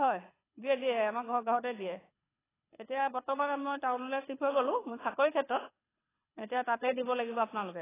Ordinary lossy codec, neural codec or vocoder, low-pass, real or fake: AAC, 16 kbps; codec, 24 kHz, 6 kbps, HILCodec; 3.6 kHz; fake